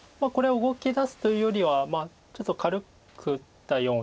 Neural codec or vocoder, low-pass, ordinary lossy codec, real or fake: none; none; none; real